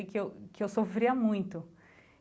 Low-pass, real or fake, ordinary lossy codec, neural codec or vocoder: none; real; none; none